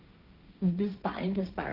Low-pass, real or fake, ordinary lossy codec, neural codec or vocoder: 5.4 kHz; fake; Opus, 24 kbps; codec, 16 kHz, 1.1 kbps, Voila-Tokenizer